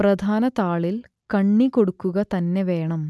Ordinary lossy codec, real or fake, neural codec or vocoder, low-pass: none; real; none; none